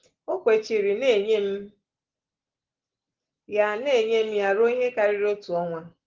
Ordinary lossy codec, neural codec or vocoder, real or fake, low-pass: Opus, 16 kbps; none; real; 7.2 kHz